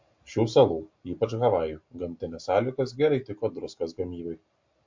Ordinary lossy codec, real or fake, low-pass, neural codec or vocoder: MP3, 48 kbps; real; 7.2 kHz; none